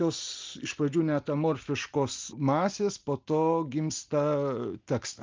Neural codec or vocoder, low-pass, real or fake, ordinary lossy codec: none; 7.2 kHz; real; Opus, 32 kbps